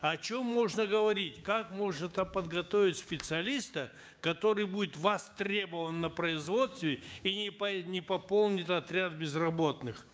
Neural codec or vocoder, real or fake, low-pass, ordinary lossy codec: codec, 16 kHz, 6 kbps, DAC; fake; none; none